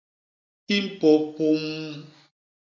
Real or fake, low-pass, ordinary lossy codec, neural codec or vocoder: real; 7.2 kHz; MP3, 64 kbps; none